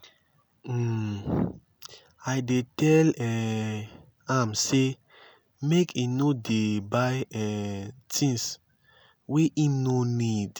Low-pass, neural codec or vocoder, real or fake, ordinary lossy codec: none; none; real; none